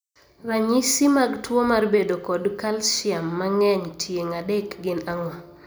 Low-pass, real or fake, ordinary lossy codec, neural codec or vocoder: none; real; none; none